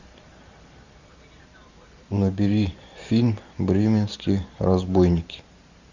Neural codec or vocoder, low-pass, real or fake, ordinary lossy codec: vocoder, 44.1 kHz, 128 mel bands every 512 samples, BigVGAN v2; 7.2 kHz; fake; Opus, 64 kbps